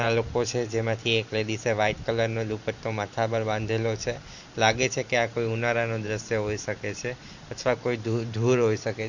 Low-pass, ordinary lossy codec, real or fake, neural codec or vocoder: 7.2 kHz; Opus, 64 kbps; fake; vocoder, 44.1 kHz, 128 mel bands every 512 samples, BigVGAN v2